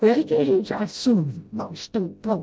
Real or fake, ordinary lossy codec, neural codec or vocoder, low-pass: fake; none; codec, 16 kHz, 0.5 kbps, FreqCodec, smaller model; none